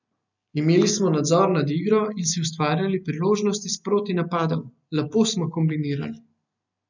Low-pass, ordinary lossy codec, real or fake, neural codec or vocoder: 7.2 kHz; none; real; none